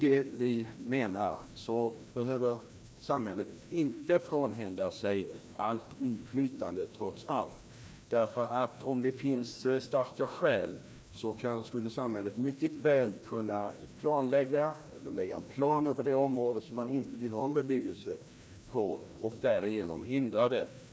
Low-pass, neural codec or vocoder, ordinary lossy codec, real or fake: none; codec, 16 kHz, 1 kbps, FreqCodec, larger model; none; fake